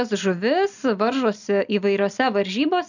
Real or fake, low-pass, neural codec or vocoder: real; 7.2 kHz; none